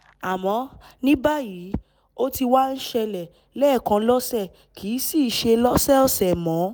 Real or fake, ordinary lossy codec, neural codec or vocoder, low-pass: real; none; none; none